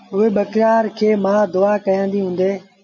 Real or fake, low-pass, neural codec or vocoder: real; 7.2 kHz; none